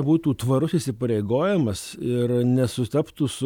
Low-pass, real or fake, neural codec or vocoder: 19.8 kHz; real; none